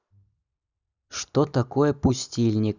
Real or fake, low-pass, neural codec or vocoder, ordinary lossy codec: real; 7.2 kHz; none; none